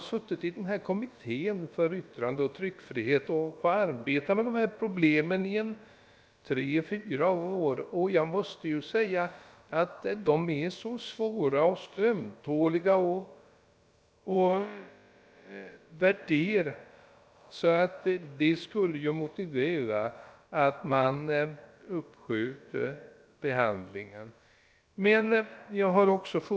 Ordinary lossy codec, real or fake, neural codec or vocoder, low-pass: none; fake; codec, 16 kHz, about 1 kbps, DyCAST, with the encoder's durations; none